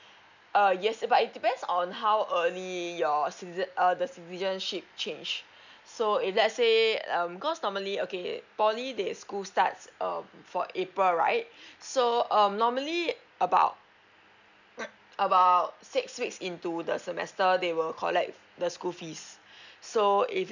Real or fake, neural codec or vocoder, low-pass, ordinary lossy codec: real; none; 7.2 kHz; none